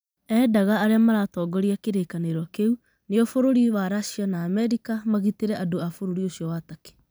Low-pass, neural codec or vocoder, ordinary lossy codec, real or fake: none; vocoder, 44.1 kHz, 128 mel bands every 256 samples, BigVGAN v2; none; fake